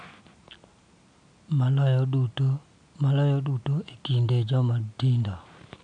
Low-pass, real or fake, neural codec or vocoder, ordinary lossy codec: 9.9 kHz; real; none; none